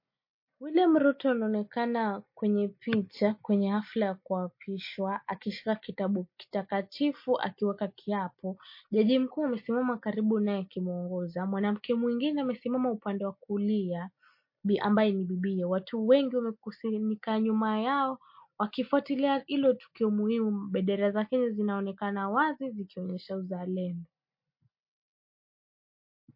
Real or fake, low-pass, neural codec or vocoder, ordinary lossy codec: real; 5.4 kHz; none; MP3, 32 kbps